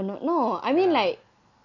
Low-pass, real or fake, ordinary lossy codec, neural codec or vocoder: 7.2 kHz; real; none; none